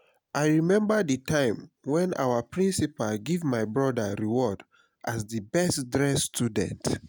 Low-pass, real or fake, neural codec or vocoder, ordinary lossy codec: none; real; none; none